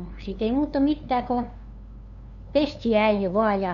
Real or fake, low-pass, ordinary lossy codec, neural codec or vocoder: fake; 7.2 kHz; none; codec, 16 kHz, 2 kbps, FunCodec, trained on LibriTTS, 25 frames a second